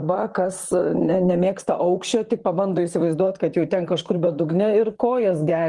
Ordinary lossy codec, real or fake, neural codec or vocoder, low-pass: Opus, 24 kbps; fake; vocoder, 44.1 kHz, 128 mel bands, Pupu-Vocoder; 10.8 kHz